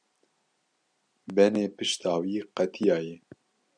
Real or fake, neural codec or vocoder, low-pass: real; none; 9.9 kHz